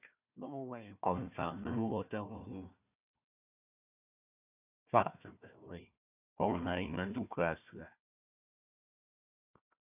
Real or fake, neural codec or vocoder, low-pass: fake; codec, 16 kHz, 1 kbps, FunCodec, trained on Chinese and English, 50 frames a second; 3.6 kHz